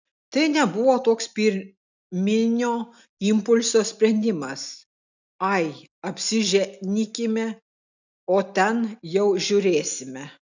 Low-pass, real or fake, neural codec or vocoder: 7.2 kHz; real; none